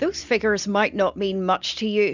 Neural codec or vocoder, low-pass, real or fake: none; 7.2 kHz; real